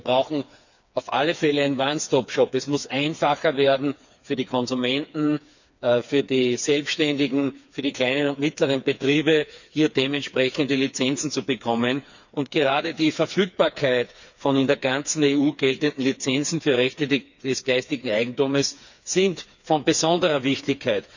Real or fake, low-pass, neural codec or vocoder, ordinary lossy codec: fake; 7.2 kHz; codec, 16 kHz, 4 kbps, FreqCodec, smaller model; none